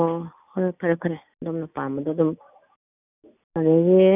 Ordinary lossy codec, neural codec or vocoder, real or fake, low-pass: none; none; real; 3.6 kHz